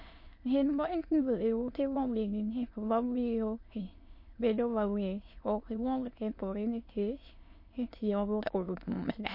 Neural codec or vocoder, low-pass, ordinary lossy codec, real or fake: autoencoder, 22.05 kHz, a latent of 192 numbers a frame, VITS, trained on many speakers; 5.4 kHz; MP3, 32 kbps; fake